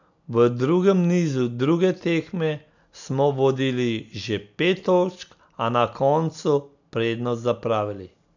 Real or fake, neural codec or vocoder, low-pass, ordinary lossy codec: real; none; 7.2 kHz; none